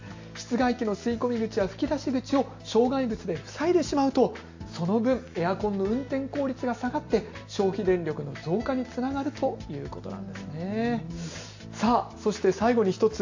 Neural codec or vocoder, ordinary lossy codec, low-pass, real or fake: none; AAC, 48 kbps; 7.2 kHz; real